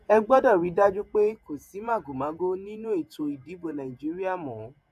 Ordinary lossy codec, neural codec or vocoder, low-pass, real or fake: AAC, 96 kbps; vocoder, 48 kHz, 128 mel bands, Vocos; 14.4 kHz; fake